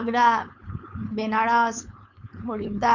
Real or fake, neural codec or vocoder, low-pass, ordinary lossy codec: fake; codec, 16 kHz, 4.8 kbps, FACodec; 7.2 kHz; none